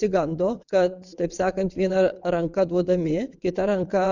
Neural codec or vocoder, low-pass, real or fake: vocoder, 22.05 kHz, 80 mel bands, WaveNeXt; 7.2 kHz; fake